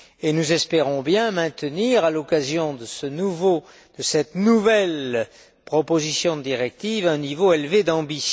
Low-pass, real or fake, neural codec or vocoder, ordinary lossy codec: none; real; none; none